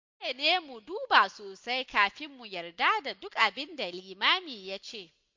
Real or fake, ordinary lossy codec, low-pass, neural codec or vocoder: real; MP3, 48 kbps; 7.2 kHz; none